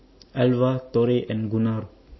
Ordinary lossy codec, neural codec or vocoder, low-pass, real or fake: MP3, 24 kbps; none; 7.2 kHz; real